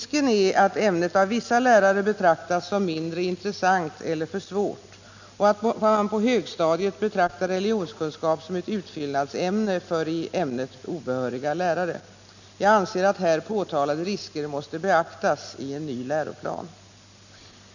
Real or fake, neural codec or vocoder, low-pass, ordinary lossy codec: real; none; 7.2 kHz; none